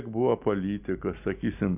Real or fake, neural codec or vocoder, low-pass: real; none; 3.6 kHz